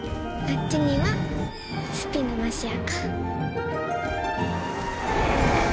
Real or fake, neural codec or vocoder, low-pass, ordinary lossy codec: real; none; none; none